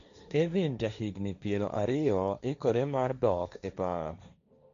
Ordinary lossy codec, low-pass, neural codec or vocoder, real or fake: MP3, 96 kbps; 7.2 kHz; codec, 16 kHz, 1.1 kbps, Voila-Tokenizer; fake